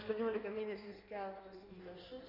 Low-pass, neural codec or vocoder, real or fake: 5.4 kHz; codec, 16 kHz in and 24 kHz out, 1.1 kbps, FireRedTTS-2 codec; fake